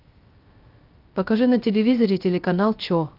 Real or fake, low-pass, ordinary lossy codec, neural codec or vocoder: fake; 5.4 kHz; Opus, 32 kbps; codec, 16 kHz, 0.3 kbps, FocalCodec